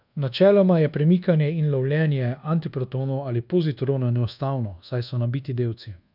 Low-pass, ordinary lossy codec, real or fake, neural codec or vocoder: 5.4 kHz; none; fake; codec, 24 kHz, 1.2 kbps, DualCodec